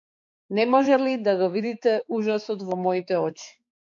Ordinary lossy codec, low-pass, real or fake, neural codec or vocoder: MP3, 48 kbps; 7.2 kHz; fake; codec, 16 kHz, 4 kbps, X-Codec, HuBERT features, trained on balanced general audio